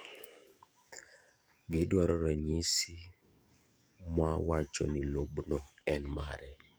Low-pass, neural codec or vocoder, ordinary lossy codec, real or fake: none; codec, 44.1 kHz, 7.8 kbps, DAC; none; fake